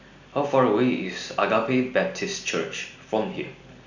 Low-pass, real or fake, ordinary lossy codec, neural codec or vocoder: 7.2 kHz; real; none; none